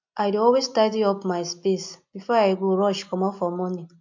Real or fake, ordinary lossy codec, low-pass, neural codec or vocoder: real; MP3, 48 kbps; 7.2 kHz; none